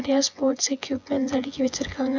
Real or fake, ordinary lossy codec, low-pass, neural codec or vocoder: fake; MP3, 64 kbps; 7.2 kHz; vocoder, 24 kHz, 100 mel bands, Vocos